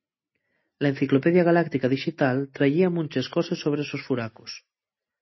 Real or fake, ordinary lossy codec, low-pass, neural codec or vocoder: real; MP3, 24 kbps; 7.2 kHz; none